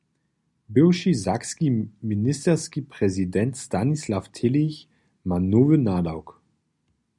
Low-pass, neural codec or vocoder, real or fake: 10.8 kHz; none; real